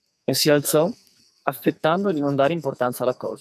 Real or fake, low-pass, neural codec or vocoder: fake; 14.4 kHz; codec, 44.1 kHz, 2.6 kbps, SNAC